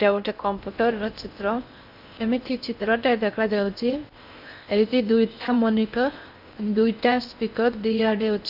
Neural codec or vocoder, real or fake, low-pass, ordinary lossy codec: codec, 16 kHz in and 24 kHz out, 0.6 kbps, FocalCodec, streaming, 4096 codes; fake; 5.4 kHz; none